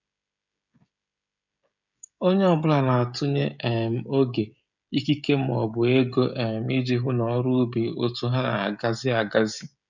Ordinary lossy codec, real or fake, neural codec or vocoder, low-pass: none; fake; codec, 16 kHz, 16 kbps, FreqCodec, smaller model; 7.2 kHz